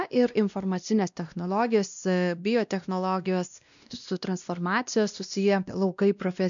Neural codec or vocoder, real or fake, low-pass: codec, 16 kHz, 1 kbps, X-Codec, WavLM features, trained on Multilingual LibriSpeech; fake; 7.2 kHz